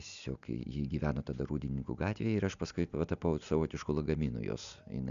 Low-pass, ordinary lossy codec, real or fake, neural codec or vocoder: 7.2 kHz; MP3, 96 kbps; real; none